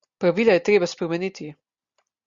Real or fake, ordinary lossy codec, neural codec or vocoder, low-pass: real; Opus, 64 kbps; none; 7.2 kHz